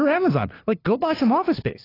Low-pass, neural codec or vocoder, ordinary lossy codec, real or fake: 5.4 kHz; codec, 16 kHz, 2 kbps, FunCodec, trained on LibriTTS, 25 frames a second; AAC, 24 kbps; fake